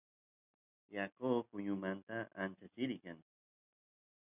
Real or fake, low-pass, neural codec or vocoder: real; 3.6 kHz; none